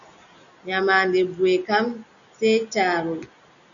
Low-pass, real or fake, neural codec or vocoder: 7.2 kHz; real; none